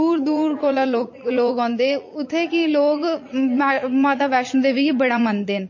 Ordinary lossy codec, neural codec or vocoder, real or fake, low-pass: MP3, 32 kbps; vocoder, 44.1 kHz, 80 mel bands, Vocos; fake; 7.2 kHz